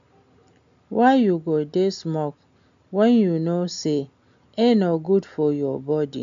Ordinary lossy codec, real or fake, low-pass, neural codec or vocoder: AAC, 64 kbps; real; 7.2 kHz; none